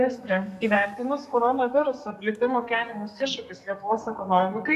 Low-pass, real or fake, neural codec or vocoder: 14.4 kHz; fake; codec, 32 kHz, 1.9 kbps, SNAC